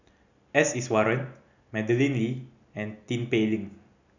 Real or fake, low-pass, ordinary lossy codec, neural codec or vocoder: real; 7.2 kHz; none; none